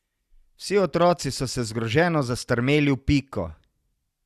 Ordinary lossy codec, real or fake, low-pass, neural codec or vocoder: Opus, 64 kbps; real; 14.4 kHz; none